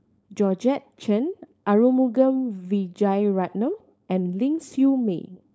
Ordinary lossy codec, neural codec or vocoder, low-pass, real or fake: none; codec, 16 kHz, 4.8 kbps, FACodec; none; fake